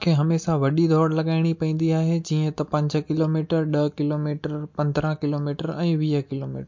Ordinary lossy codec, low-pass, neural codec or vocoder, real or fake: MP3, 48 kbps; 7.2 kHz; none; real